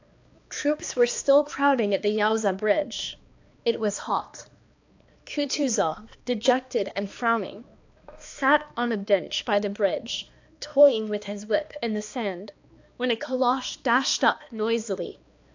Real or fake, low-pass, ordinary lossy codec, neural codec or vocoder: fake; 7.2 kHz; AAC, 48 kbps; codec, 16 kHz, 2 kbps, X-Codec, HuBERT features, trained on balanced general audio